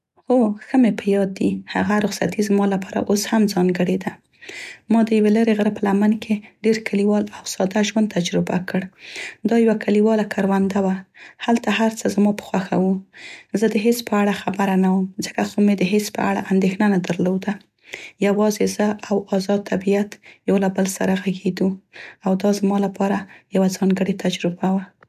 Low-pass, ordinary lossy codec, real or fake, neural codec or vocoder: 14.4 kHz; none; real; none